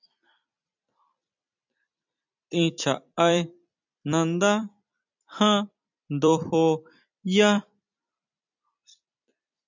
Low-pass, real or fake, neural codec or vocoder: 7.2 kHz; fake; vocoder, 44.1 kHz, 128 mel bands every 256 samples, BigVGAN v2